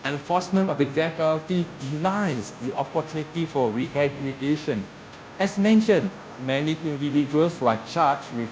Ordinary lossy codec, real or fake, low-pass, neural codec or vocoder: none; fake; none; codec, 16 kHz, 0.5 kbps, FunCodec, trained on Chinese and English, 25 frames a second